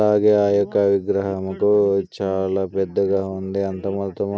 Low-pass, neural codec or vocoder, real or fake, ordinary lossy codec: none; none; real; none